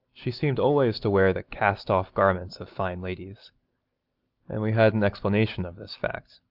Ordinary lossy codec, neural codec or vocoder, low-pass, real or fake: Opus, 24 kbps; none; 5.4 kHz; real